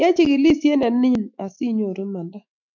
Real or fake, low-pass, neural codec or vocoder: fake; 7.2 kHz; autoencoder, 48 kHz, 128 numbers a frame, DAC-VAE, trained on Japanese speech